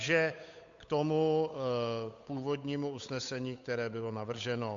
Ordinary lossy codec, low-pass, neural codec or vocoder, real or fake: MP3, 64 kbps; 7.2 kHz; codec, 16 kHz, 8 kbps, FunCodec, trained on Chinese and English, 25 frames a second; fake